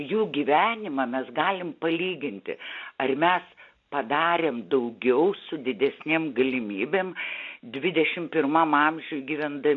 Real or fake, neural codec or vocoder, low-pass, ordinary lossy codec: real; none; 7.2 kHz; AAC, 64 kbps